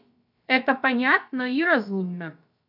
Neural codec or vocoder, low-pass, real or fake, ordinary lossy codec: codec, 16 kHz, about 1 kbps, DyCAST, with the encoder's durations; 5.4 kHz; fake; AAC, 48 kbps